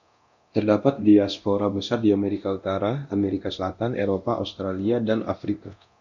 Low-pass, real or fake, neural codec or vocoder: 7.2 kHz; fake; codec, 24 kHz, 0.9 kbps, DualCodec